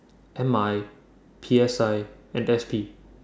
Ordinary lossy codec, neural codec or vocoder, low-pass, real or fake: none; none; none; real